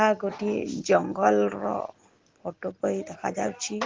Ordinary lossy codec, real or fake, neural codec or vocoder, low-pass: Opus, 16 kbps; real; none; 7.2 kHz